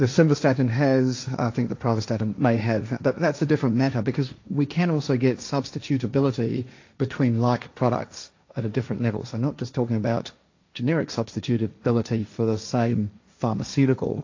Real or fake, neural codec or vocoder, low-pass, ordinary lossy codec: fake; codec, 16 kHz, 1.1 kbps, Voila-Tokenizer; 7.2 kHz; AAC, 48 kbps